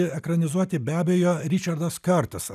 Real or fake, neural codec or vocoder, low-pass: real; none; 14.4 kHz